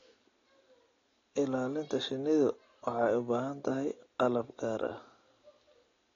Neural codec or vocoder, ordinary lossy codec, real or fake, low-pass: none; AAC, 32 kbps; real; 7.2 kHz